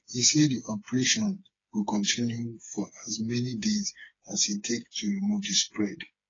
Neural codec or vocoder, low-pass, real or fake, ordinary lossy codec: codec, 16 kHz, 4 kbps, FreqCodec, smaller model; 7.2 kHz; fake; AAC, 32 kbps